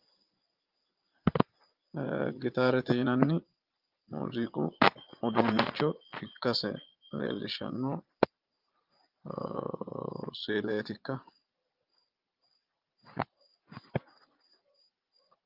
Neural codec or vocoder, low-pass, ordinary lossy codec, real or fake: vocoder, 22.05 kHz, 80 mel bands, Vocos; 5.4 kHz; Opus, 24 kbps; fake